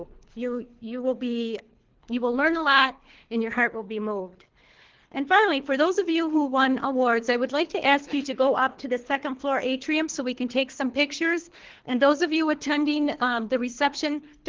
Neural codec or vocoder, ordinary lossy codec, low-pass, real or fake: codec, 24 kHz, 3 kbps, HILCodec; Opus, 16 kbps; 7.2 kHz; fake